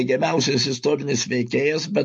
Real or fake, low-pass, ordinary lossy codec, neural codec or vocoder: real; 10.8 kHz; MP3, 48 kbps; none